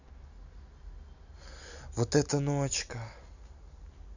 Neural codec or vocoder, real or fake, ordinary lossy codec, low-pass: none; real; none; 7.2 kHz